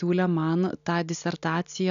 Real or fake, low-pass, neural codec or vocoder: real; 7.2 kHz; none